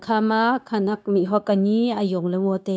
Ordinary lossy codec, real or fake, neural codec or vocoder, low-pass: none; fake; codec, 16 kHz, 0.9 kbps, LongCat-Audio-Codec; none